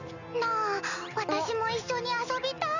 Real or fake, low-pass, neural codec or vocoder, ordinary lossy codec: real; 7.2 kHz; none; none